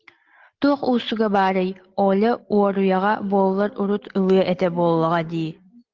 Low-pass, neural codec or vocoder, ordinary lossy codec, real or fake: 7.2 kHz; none; Opus, 16 kbps; real